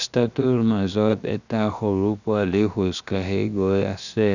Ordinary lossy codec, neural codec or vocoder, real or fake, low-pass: none; codec, 16 kHz, 0.7 kbps, FocalCodec; fake; 7.2 kHz